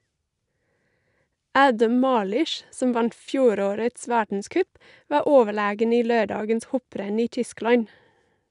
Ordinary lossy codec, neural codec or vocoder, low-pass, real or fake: none; none; 10.8 kHz; real